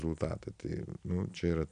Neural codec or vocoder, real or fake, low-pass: none; real; 9.9 kHz